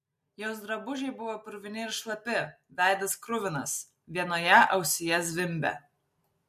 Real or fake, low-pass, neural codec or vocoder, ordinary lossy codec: real; 14.4 kHz; none; MP3, 64 kbps